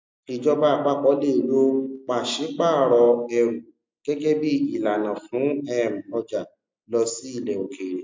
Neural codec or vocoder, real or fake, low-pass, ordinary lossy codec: none; real; 7.2 kHz; MP3, 64 kbps